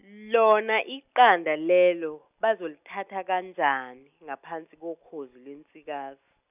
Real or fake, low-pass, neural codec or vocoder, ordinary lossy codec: real; 3.6 kHz; none; none